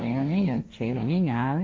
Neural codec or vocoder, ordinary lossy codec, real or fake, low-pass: codec, 16 kHz, 1.1 kbps, Voila-Tokenizer; none; fake; none